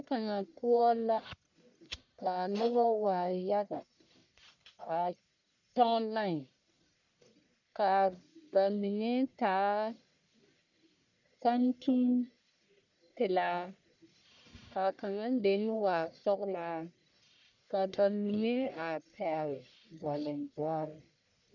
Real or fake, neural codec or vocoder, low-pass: fake; codec, 44.1 kHz, 1.7 kbps, Pupu-Codec; 7.2 kHz